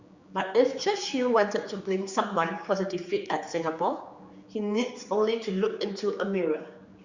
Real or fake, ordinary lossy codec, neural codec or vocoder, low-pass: fake; Opus, 64 kbps; codec, 16 kHz, 4 kbps, X-Codec, HuBERT features, trained on general audio; 7.2 kHz